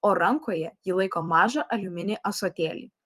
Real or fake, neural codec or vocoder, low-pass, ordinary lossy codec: fake; vocoder, 44.1 kHz, 128 mel bands every 256 samples, BigVGAN v2; 14.4 kHz; Opus, 32 kbps